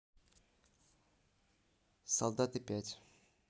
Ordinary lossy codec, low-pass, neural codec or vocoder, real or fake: none; none; none; real